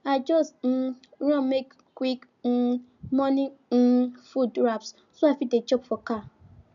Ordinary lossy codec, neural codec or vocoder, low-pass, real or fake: none; none; 7.2 kHz; real